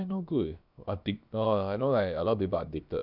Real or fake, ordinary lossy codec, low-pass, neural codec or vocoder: fake; none; 5.4 kHz; codec, 16 kHz, about 1 kbps, DyCAST, with the encoder's durations